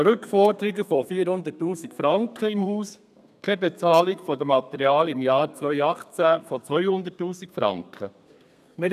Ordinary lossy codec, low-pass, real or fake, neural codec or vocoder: none; 14.4 kHz; fake; codec, 32 kHz, 1.9 kbps, SNAC